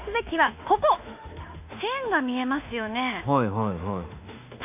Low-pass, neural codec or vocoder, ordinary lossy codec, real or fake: 3.6 kHz; autoencoder, 48 kHz, 32 numbers a frame, DAC-VAE, trained on Japanese speech; MP3, 32 kbps; fake